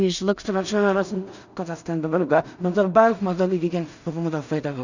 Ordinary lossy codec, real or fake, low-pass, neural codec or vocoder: none; fake; 7.2 kHz; codec, 16 kHz in and 24 kHz out, 0.4 kbps, LongCat-Audio-Codec, two codebook decoder